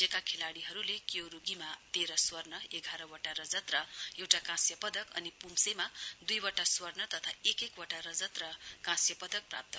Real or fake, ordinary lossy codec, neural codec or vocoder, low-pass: real; none; none; none